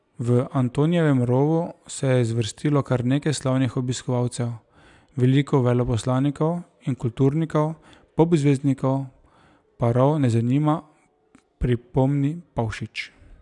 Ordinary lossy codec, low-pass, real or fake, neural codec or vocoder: none; 10.8 kHz; real; none